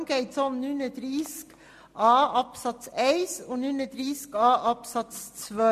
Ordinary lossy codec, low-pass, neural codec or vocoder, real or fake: MP3, 64 kbps; 14.4 kHz; none; real